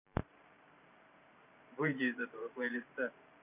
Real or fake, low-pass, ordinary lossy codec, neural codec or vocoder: fake; 3.6 kHz; none; vocoder, 44.1 kHz, 128 mel bands every 512 samples, BigVGAN v2